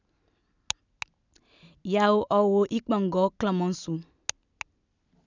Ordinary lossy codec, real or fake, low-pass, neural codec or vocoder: none; real; 7.2 kHz; none